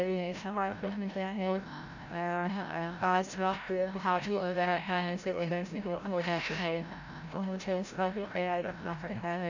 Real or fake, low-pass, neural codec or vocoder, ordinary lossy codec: fake; 7.2 kHz; codec, 16 kHz, 0.5 kbps, FreqCodec, larger model; none